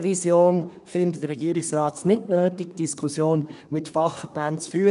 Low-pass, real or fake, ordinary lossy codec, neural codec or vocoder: 10.8 kHz; fake; none; codec, 24 kHz, 1 kbps, SNAC